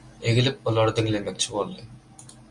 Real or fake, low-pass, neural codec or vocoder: real; 10.8 kHz; none